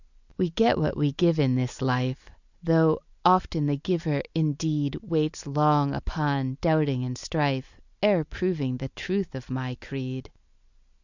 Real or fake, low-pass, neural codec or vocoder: real; 7.2 kHz; none